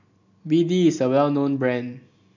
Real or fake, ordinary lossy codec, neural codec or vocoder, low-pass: real; none; none; 7.2 kHz